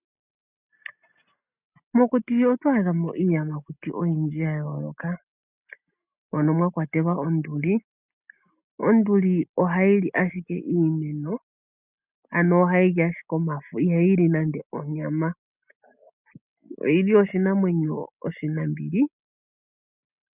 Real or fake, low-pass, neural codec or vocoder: real; 3.6 kHz; none